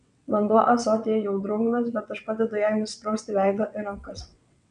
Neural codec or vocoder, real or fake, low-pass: vocoder, 22.05 kHz, 80 mel bands, WaveNeXt; fake; 9.9 kHz